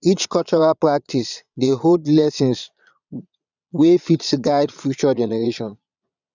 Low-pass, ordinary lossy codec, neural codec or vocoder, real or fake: 7.2 kHz; none; vocoder, 22.05 kHz, 80 mel bands, Vocos; fake